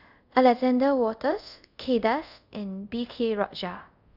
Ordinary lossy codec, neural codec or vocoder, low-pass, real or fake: Opus, 64 kbps; codec, 24 kHz, 0.5 kbps, DualCodec; 5.4 kHz; fake